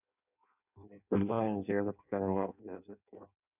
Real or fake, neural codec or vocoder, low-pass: fake; codec, 16 kHz in and 24 kHz out, 0.6 kbps, FireRedTTS-2 codec; 3.6 kHz